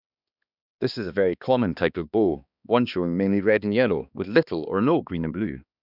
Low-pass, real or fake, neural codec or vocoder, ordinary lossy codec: 5.4 kHz; fake; codec, 16 kHz, 2 kbps, X-Codec, HuBERT features, trained on balanced general audio; none